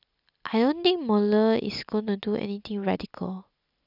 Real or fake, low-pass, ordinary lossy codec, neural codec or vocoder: real; 5.4 kHz; none; none